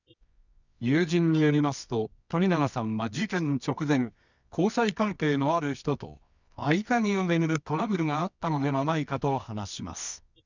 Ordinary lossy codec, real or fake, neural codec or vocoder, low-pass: none; fake; codec, 24 kHz, 0.9 kbps, WavTokenizer, medium music audio release; 7.2 kHz